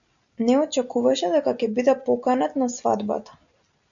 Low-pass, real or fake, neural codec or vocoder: 7.2 kHz; real; none